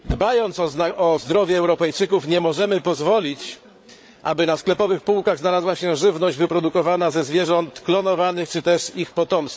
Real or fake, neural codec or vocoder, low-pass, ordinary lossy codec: fake; codec, 16 kHz, 8 kbps, FreqCodec, larger model; none; none